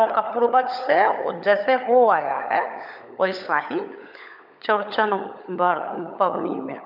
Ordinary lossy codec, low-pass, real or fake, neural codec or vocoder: none; 5.4 kHz; fake; codec, 16 kHz, 16 kbps, FunCodec, trained on LibriTTS, 50 frames a second